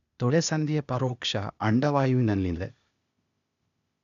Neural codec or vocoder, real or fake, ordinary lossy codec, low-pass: codec, 16 kHz, 0.8 kbps, ZipCodec; fake; none; 7.2 kHz